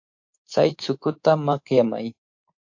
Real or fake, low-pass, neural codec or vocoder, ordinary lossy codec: fake; 7.2 kHz; codec, 24 kHz, 3.1 kbps, DualCodec; AAC, 48 kbps